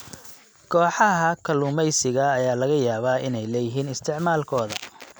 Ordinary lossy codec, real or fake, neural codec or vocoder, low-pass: none; real; none; none